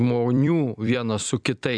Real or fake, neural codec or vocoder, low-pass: fake; vocoder, 44.1 kHz, 128 mel bands every 256 samples, BigVGAN v2; 9.9 kHz